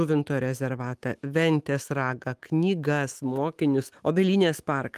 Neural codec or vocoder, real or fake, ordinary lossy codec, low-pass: autoencoder, 48 kHz, 128 numbers a frame, DAC-VAE, trained on Japanese speech; fake; Opus, 24 kbps; 14.4 kHz